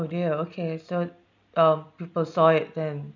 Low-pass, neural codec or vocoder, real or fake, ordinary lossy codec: 7.2 kHz; none; real; none